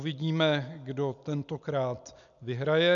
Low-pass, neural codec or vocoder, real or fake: 7.2 kHz; none; real